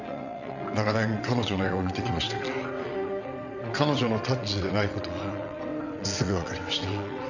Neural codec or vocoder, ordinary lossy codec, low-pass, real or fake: vocoder, 22.05 kHz, 80 mel bands, WaveNeXt; none; 7.2 kHz; fake